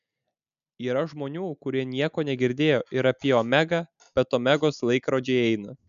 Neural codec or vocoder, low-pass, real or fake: none; 7.2 kHz; real